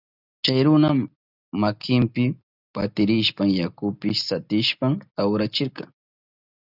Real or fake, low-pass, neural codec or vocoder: real; 5.4 kHz; none